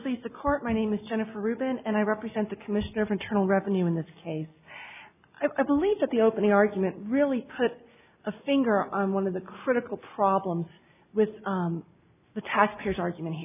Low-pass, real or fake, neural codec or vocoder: 3.6 kHz; real; none